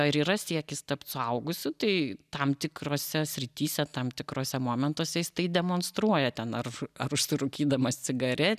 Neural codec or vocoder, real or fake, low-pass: none; real; 14.4 kHz